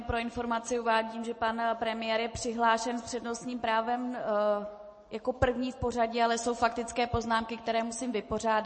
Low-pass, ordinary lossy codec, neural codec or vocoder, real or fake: 9.9 kHz; MP3, 32 kbps; none; real